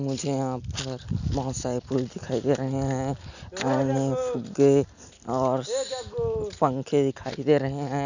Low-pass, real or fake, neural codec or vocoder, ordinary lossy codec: 7.2 kHz; real; none; none